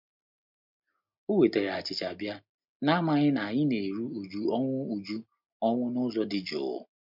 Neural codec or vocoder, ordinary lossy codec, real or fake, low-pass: none; MP3, 48 kbps; real; 5.4 kHz